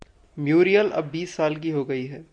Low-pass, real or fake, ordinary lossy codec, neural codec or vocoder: 9.9 kHz; real; MP3, 64 kbps; none